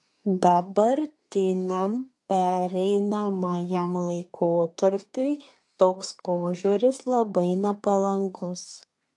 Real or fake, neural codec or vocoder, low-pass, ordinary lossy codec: fake; codec, 24 kHz, 1 kbps, SNAC; 10.8 kHz; AAC, 64 kbps